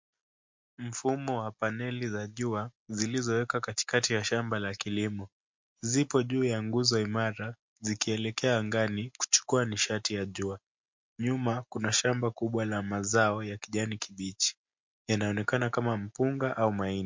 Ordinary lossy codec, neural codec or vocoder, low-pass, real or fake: MP3, 48 kbps; none; 7.2 kHz; real